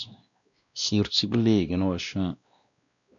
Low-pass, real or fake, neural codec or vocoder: 7.2 kHz; fake; codec, 16 kHz, 1 kbps, X-Codec, WavLM features, trained on Multilingual LibriSpeech